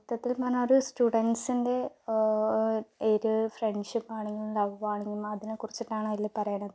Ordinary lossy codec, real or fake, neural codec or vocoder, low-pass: none; real; none; none